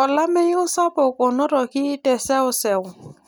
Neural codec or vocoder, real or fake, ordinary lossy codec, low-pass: none; real; none; none